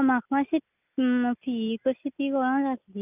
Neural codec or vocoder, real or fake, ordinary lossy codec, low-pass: none; real; none; 3.6 kHz